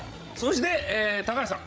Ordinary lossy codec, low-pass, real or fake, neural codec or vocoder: none; none; fake; codec, 16 kHz, 16 kbps, FreqCodec, larger model